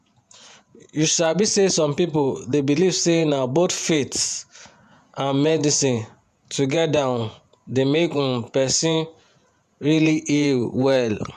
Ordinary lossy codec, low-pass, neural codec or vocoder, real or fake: none; 14.4 kHz; vocoder, 48 kHz, 128 mel bands, Vocos; fake